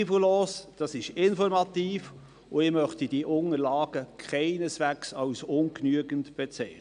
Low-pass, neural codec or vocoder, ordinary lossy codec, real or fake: 9.9 kHz; none; none; real